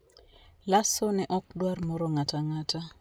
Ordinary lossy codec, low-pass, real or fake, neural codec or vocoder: none; none; real; none